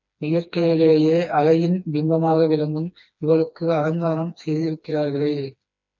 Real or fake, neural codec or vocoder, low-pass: fake; codec, 16 kHz, 2 kbps, FreqCodec, smaller model; 7.2 kHz